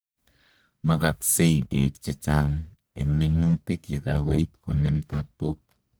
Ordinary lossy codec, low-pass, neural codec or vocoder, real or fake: none; none; codec, 44.1 kHz, 1.7 kbps, Pupu-Codec; fake